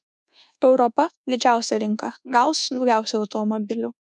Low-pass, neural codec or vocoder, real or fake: 10.8 kHz; codec, 24 kHz, 1.2 kbps, DualCodec; fake